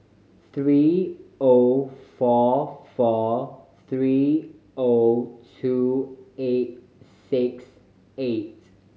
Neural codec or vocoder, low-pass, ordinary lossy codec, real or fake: none; none; none; real